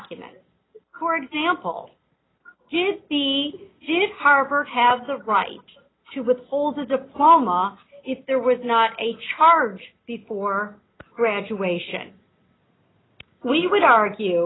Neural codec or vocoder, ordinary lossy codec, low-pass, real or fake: none; AAC, 16 kbps; 7.2 kHz; real